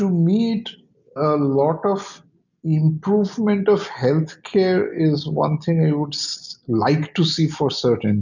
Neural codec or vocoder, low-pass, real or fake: none; 7.2 kHz; real